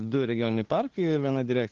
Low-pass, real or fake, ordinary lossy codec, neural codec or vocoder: 7.2 kHz; fake; Opus, 16 kbps; codec, 16 kHz, 2 kbps, FunCodec, trained on LibriTTS, 25 frames a second